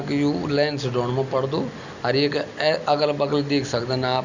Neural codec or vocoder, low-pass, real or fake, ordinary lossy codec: none; 7.2 kHz; real; Opus, 64 kbps